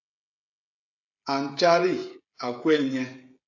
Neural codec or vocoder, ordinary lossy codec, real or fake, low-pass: codec, 16 kHz, 16 kbps, FreqCodec, smaller model; AAC, 48 kbps; fake; 7.2 kHz